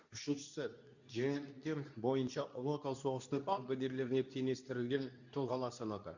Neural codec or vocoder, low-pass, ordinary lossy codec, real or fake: codec, 24 kHz, 0.9 kbps, WavTokenizer, medium speech release version 2; 7.2 kHz; none; fake